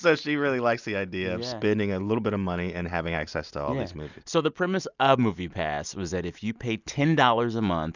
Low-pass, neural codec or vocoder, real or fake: 7.2 kHz; none; real